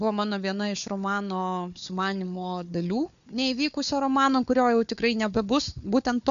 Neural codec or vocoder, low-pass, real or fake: codec, 16 kHz, 4 kbps, FunCodec, trained on Chinese and English, 50 frames a second; 7.2 kHz; fake